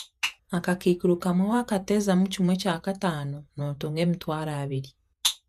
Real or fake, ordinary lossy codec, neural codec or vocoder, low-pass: fake; none; vocoder, 48 kHz, 128 mel bands, Vocos; 14.4 kHz